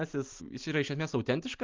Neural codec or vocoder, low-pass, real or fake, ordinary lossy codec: none; 7.2 kHz; real; Opus, 24 kbps